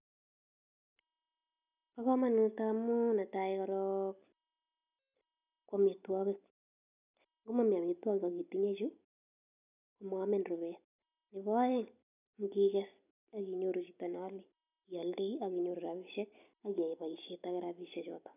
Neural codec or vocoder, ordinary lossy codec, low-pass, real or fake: none; none; 3.6 kHz; real